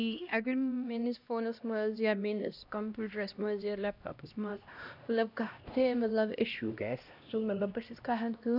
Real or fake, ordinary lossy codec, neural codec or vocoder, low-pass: fake; none; codec, 16 kHz, 1 kbps, X-Codec, HuBERT features, trained on LibriSpeech; 5.4 kHz